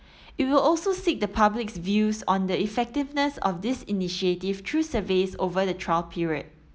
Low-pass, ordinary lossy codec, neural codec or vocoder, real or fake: none; none; none; real